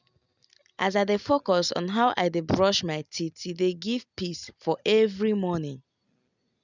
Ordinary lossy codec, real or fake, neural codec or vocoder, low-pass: none; real; none; 7.2 kHz